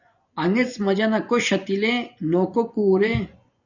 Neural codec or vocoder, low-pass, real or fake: vocoder, 24 kHz, 100 mel bands, Vocos; 7.2 kHz; fake